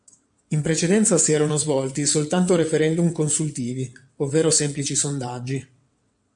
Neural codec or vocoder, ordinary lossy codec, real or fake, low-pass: vocoder, 22.05 kHz, 80 mel bands, WaveNeXt; MP3, 64 kbps; fake; 9.9 kHz